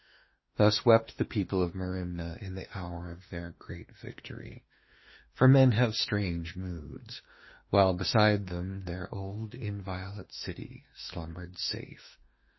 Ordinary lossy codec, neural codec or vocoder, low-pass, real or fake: MP3, 24 kbps; autoencoder, 48 kHz, 32 numbers a frame, DAC-VAE, trained on Japanese speech; 7.2 kHz; fake